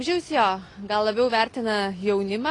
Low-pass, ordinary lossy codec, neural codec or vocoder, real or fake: 10.8 kHz; AAC, 32 kbps; none; real